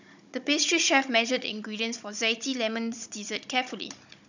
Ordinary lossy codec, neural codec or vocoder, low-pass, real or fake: none; codec, 16 kHz, 16 kbps, FunCodec, trained on Chinese and English, 50 frames a second; 7.2 kHz; fake